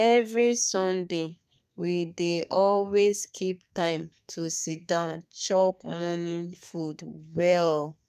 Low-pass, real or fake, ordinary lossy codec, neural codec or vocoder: 14.4 kHz; fake; none; codec, 32 kHz, 1.9 kbps, SNAC